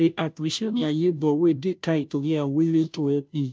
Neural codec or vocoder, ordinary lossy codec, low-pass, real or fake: codec, 16 kHz, 0.5 kbps, FunCodec, trained on Chinese and English, 25 frames a second; none; none; fake